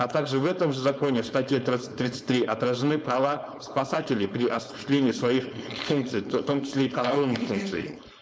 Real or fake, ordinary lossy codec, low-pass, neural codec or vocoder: fake; none; none; codec, 16 kHz, 4.8 kbps, FACodec